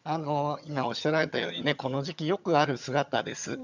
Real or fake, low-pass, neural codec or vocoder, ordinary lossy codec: fake; 7.2 kHz; vocoder, 22.05 kHz, 80 mel bands, HiFi-GAN; none